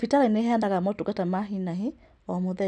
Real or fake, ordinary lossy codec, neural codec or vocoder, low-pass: real; none; none; 9.9 kHz